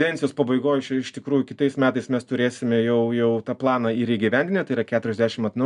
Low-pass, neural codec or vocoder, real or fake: 10.8 kHz; none; real